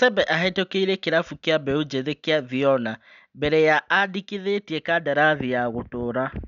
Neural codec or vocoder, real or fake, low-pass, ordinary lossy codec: none; real; 7.2 kHz; none